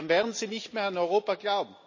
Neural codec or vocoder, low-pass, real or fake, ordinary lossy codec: none; 7.2 kHz; real; MP3, 32 kbps